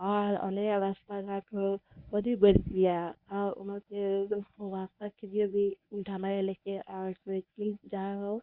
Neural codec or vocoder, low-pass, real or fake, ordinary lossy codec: codec, 24 kHz, 0.9 kbps, WavTokenizer, medium speech release version 1; 5.4 kHz; fake; Opus, 64 kbps